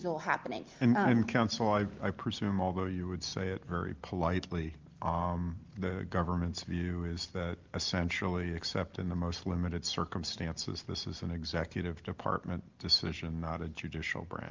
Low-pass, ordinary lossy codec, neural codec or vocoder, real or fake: 7.2 kHz; Opus, 32 kbps; none; real